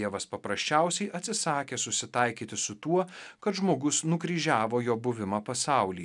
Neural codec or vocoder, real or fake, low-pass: none; real; 10.8 kHz